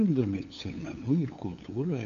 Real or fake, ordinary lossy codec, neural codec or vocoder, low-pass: fake; AAC, 64 kbps; codec, 16 kHz, 8 kbps, FunCodec, trained on LibriTTS, 25 frames a second; 7.2 kHz